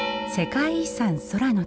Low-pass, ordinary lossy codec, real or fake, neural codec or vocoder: none; none; real; none